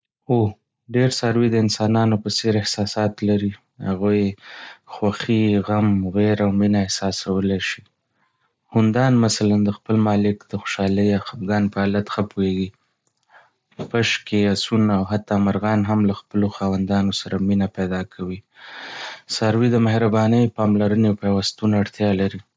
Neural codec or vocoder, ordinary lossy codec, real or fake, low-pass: none; none; real; none